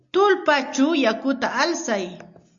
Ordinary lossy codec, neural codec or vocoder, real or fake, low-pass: Opus, 64 kbps; none; real; 7.2 kHz